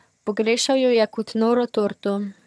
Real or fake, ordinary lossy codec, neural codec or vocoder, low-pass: fake; none; vocoder, 22.05 kHz, 80 mel bands, HiFi-GAN; none